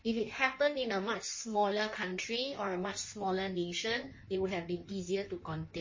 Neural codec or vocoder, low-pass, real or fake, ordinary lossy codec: codec, 16 kHz in and 24 kHz out, 1.1 kbps, FireRedTTS-2 codec; 7.2 kHz; fake; MP3, 32 kbps